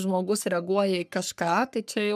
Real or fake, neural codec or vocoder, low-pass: fake; codec, 44.1 kHz, 3.4 kbps, Pupu-Codec; 14.4 kHz